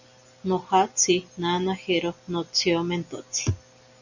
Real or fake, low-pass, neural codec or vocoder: real; 7.2 kHz; none